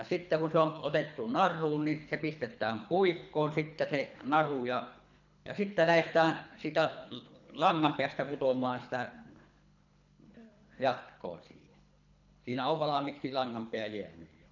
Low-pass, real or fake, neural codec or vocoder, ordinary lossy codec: 7.2 kHz; fake; codec, 24 kHz, 3 kbps, HILCodec; none